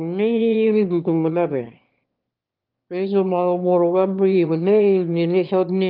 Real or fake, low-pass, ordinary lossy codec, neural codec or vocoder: fake; 5.4 kHz; Opus, 24 kbps; autoencoder, 22.05 kHz, a latent of 192 numbers a frame, VITS, trained on one speaker